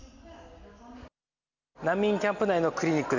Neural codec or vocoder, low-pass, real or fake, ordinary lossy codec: none; 7.2 kHz; real; none